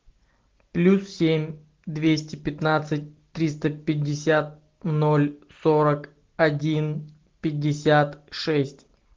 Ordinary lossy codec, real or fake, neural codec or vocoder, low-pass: Opus, 16 kbps; real; none; 7.2 kHz